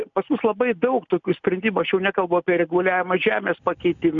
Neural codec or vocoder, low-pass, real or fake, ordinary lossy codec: none; 7.2 kHz; real; MP3, 96 kbps